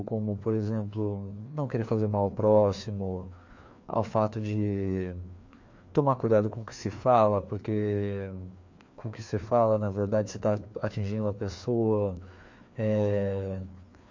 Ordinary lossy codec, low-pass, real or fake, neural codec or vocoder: MP3, 48 kbps; 7.2 kHz; fake; codec, 16 kHz, 2 kbps, FreqCodec, larger model